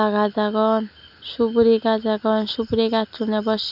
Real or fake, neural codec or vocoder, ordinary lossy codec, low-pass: real; none; none; 5.4 kHz